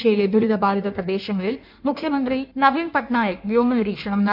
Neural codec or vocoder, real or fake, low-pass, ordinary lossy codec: codec, 16 kHz in and 24 kHz out, 1.1 kbps, FireRedTTS-2 codec; fake; 5.4 kHz; MP3, 48 kbps